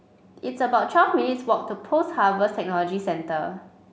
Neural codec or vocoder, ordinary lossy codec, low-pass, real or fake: none; none; none; real